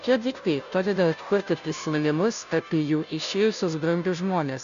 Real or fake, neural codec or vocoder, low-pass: fake; codec, 16 kHz, 0.5 kbps, FunCodec, trained on Chinese and English, 25 frames a second; 7.2 kHz